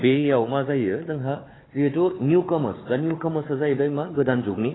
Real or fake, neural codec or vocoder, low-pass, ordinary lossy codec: fake; codec, 16 kHz, 4 kbps, FunCodec, trained on Chinese and English, 50 frames a second; 7.2 kHz; AAC, 16 kbps